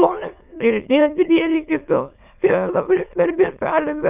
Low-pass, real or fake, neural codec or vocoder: 3.6 kHz; fake; autoencoder, 22.05 kHz, a latent of 192 numbers a frame, VITS, trained on many speakers